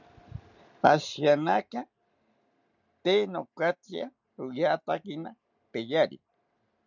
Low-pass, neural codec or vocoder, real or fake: 7.2 kHz; none; real